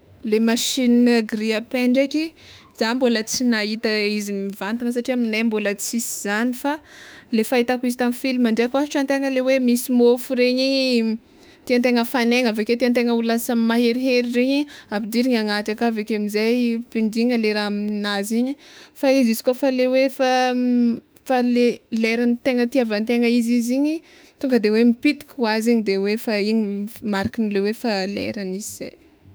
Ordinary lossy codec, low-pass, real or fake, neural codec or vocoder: none; none; fake; autoencoder, 48 kHz, 32 numbers a frame, DAC-VAE, trained on Japanese speech